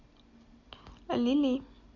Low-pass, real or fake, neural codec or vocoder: 7.2 kHz; real; none